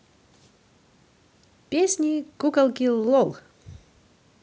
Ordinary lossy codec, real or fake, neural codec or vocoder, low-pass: none; real; none; none